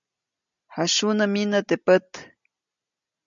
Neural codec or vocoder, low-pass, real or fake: none; 7.2 kHz; real